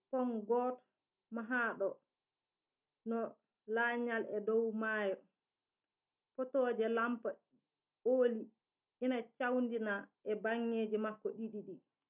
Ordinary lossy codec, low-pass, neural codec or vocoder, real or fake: none; 3.6 kHz; none; real